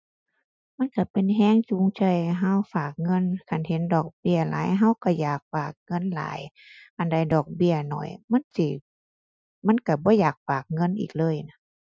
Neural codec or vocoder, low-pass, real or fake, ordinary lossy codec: none; none; real; none